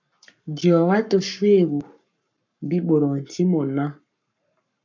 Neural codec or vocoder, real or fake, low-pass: codec, 44.1 kHz, 7.8 kbps, Pupu-Codec; fake; 7.2 kHz